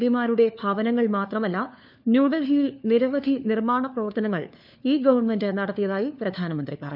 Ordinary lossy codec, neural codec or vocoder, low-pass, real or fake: none; codec, 16 kHz, 4 kbps, FunCodec, trained on LibriTTS, 50 frames a second; 5.4 kHz; fake